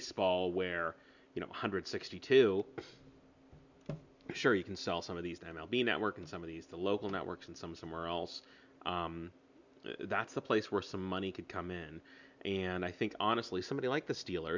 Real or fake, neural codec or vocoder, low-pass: real; none; 7.2 kHz